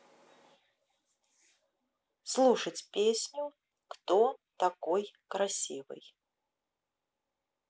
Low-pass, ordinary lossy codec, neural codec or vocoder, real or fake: none; none; none; real